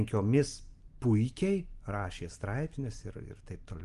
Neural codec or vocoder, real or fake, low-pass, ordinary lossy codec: none; real; 10.8 kHz; Opus, 24 kbps